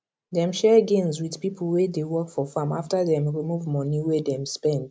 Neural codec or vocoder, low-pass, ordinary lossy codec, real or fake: none; none; none; real